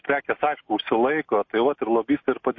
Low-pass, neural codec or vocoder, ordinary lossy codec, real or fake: 7.2 kHz; none; MP3, 32 kbps; real